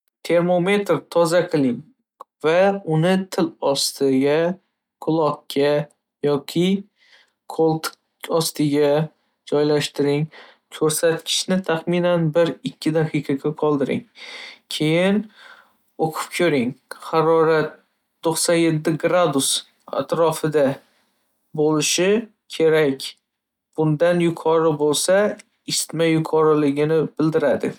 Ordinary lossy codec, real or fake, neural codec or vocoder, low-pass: none; real; none; 19.8 kHz